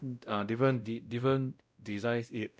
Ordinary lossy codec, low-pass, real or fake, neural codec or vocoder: none; none; fake; codec, 16 kHz, 0.5 kbps, X-Codec, WavLM features, trained on Multilingual LibriSpeech